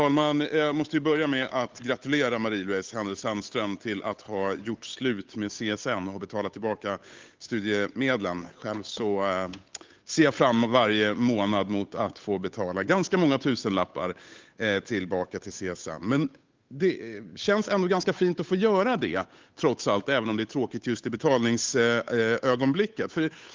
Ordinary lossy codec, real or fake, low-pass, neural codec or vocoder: Opus, 32 kbps; fake; 7.2 kHz; codec, 16 kHz, 8 kbps, FunCodec, trained on Chinese and English, 25 frames a second